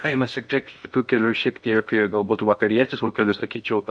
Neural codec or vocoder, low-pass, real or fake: codec, 16 kHz in and 24 kHz out, 0.8 kbps, FocalCodec, streaming, 65536 codes; 9.9 kHz; fake